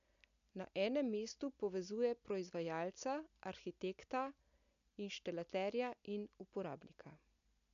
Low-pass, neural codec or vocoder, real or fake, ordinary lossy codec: 7.2 kHz; none; real; none